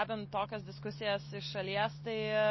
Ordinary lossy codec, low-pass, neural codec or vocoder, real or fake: MP3, 24 kbps; 7.2 kHz; none; real